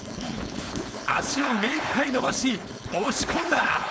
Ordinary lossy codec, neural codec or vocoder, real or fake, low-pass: none; codec, 16 kHz, 4.8 kbps, FACodec; fake; none